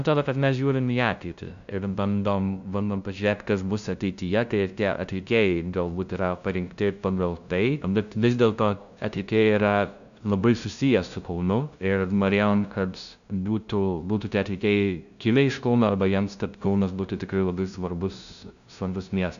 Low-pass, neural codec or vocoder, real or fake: 7.2 kHz; codec, 16 kHz, 0.5 kbps, FunCodec, trained on LibriTTS, 25 frames a second; fake